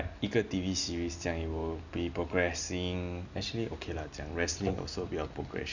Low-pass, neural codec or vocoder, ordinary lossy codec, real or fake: 7.2 kHz; none; none; real